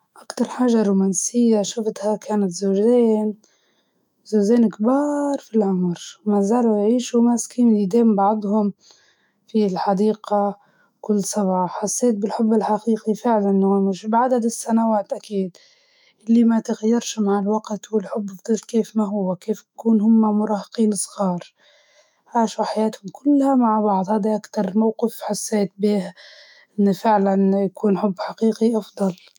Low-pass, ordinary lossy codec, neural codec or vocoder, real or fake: 19.8 kHz; none; autoencoder, 48 kHz, 128 numbers a frame, DAC-VAE, trained on Japanese speech; fake